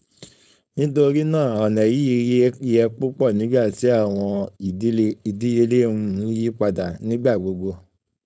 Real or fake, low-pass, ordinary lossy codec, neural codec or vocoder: fake; none; none; codec, 16 kHz, 4.8 kbps, FACodec